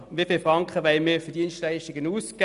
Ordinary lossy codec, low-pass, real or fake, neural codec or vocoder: none; 10.8 kHz; real; none